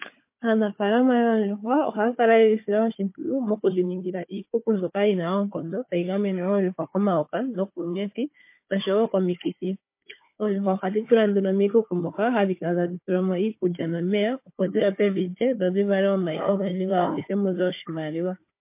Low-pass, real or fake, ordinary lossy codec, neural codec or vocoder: 3.6 kHz; fake; MP3, 24 kbps; codec, 16 kHz, 4 kbps, FunCodec, trained on Chinese and English, 50 frames a second